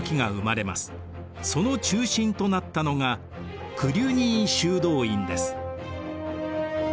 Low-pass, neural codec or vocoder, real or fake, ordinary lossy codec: none; none; real; none